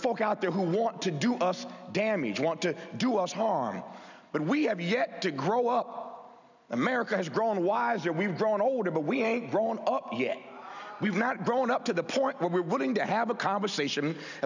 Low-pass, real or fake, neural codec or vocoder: 7.2 kHz; real; none